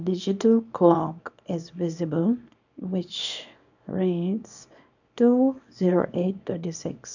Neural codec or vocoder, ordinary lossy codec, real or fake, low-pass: codec, 24 kHz, 0.9 kbps, WavTokenizer, small release; none; fake; 7.2 kHz